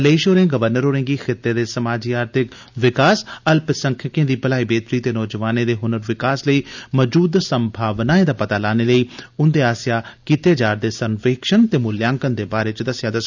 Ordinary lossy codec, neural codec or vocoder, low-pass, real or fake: none; none; 7.2 kHz; real